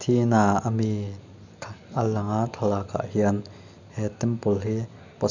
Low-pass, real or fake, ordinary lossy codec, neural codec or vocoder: 7.2 kHz; real; none; none